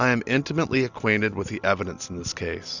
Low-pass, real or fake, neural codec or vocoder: 7.2 kHz; real; none